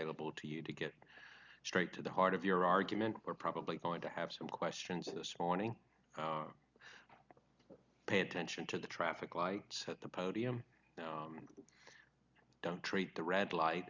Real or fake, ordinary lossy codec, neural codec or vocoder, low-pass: real; Opus, 64 kbps; none; 7.2 kHz